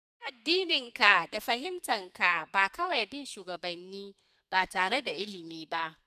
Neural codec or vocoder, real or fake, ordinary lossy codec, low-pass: codec, 32 kHz, 1.9 kbps, SNAC; fake; none; 14.4 kHz